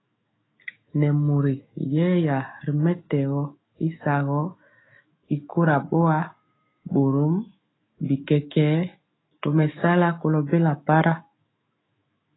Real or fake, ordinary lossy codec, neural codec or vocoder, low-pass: fake; AAC, 16 kbps; autoencoder, 48 kHz, 128 numbers a frame, DAC-VAE, trained on Japanese speech; 7.2 kHz